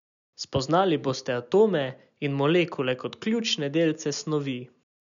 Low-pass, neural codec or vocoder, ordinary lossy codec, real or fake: 7.2 kHz; none; none; real